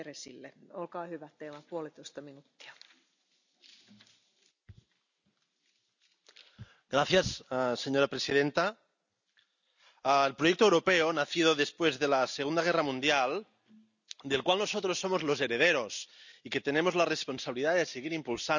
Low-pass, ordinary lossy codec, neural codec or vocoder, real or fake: 7.2 kHz; none; none; real